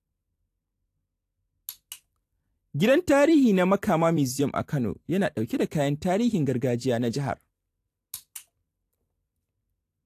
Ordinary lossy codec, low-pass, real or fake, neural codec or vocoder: AAC, 64 kbps; 14.4 kHz; fake; vocoder, 44.1 kHz, 128 mel bands every 256 samples, BigVGAN v2